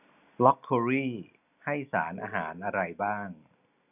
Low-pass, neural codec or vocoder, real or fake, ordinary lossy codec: 3.6 kHz; none; real; none